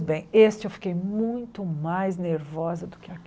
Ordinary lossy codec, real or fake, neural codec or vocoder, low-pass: none; real; none; none